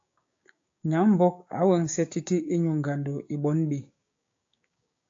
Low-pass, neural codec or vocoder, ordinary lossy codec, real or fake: 7.2 kHz; codec, 16 kHz, 6 kbps, DAC; AAC, 48 kbps; fake